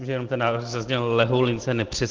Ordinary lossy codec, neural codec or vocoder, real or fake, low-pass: Opus, 16 kbps; none; real; 7.2 kHz